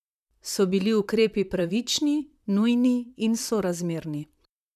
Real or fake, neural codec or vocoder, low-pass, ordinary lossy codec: fake; vocoder, 44.1 kHz, 128 mel bands, Pupu-Vocoder; 14.4 kHz; none